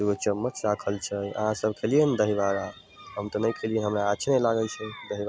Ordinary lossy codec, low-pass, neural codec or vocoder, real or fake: none; none; none; real